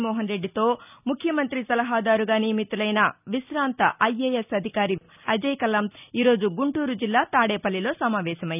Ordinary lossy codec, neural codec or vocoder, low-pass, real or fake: none; none; 3.6 kHz; real